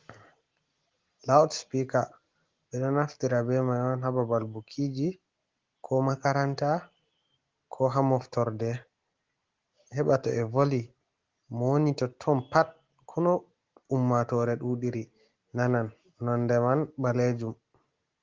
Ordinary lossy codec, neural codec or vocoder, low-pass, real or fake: Opus, 16 kbps; none; 7.2 kHz; real